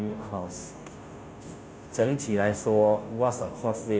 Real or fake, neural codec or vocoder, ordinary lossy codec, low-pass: fake; codec, 16 kHz, 0.5 kbps, FunCodec, trained on Chinese and English, 25 frames a second; none; none